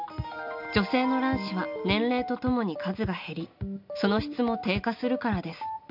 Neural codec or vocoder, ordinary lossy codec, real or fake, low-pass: none; none; real; 5.4 kHz